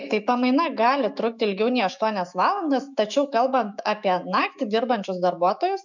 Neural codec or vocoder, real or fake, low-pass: autoencoder, 48 kHz, 128 numbers a frame, DAC-VAE, trained on Japanese speech; fake; 7.2 kHz